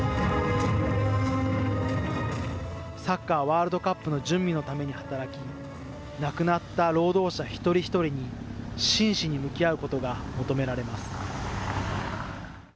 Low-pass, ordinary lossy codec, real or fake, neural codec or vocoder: none; none; real; none